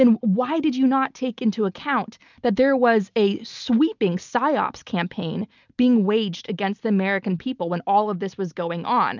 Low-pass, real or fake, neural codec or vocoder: 7.2 kHz; real; none